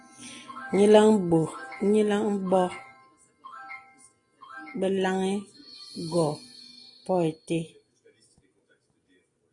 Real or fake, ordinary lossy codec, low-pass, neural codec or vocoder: real; AAC, 32 kbps; 10.8 kHz; none